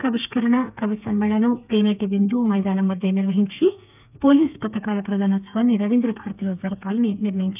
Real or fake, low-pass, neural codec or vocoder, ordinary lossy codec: fake; 3.6 kHz; codec, 32 kHz, 1.9 kbps, SNAC; none